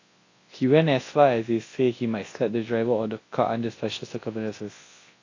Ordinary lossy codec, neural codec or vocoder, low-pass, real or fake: AAC, 32 kbps; codec, 24 kHz, 0.9 kbps, WavTokenizer, large speech release; 7.2 kHz; fake